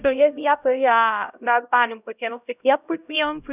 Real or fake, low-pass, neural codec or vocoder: fake; 3.6 kHz; codec, 16 kHz, 0.5 kbps, X-Codec, HuBERT features, trained on LibriSpeech